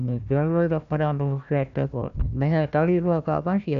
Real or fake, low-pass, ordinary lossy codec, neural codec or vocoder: fake; 7.2 kHz; MP3, 96 kbps; codec, 16 kHz, 1 kbps, FunCodec, trained on Chinese and English, 50 frames a second